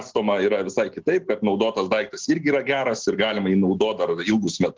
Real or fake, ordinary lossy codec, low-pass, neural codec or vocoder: real; Opus, 16 kbps; 7.2 kHz; none